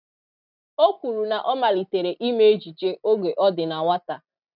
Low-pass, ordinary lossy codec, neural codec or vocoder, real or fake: 5.4 kHz; none; none; real